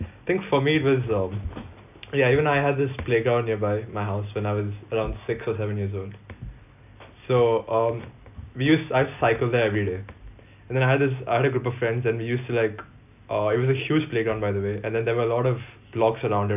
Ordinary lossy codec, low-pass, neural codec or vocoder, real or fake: none; 3.6 kHz; none; real